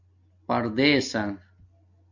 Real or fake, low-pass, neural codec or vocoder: real; 7.2 kHz; none